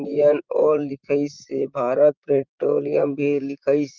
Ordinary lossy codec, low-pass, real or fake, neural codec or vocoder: Opus, 32 kbps; 7.2 kHz; fake; vocoder, 44.1 kHz, 128 mel bands, Pupu-Vocoder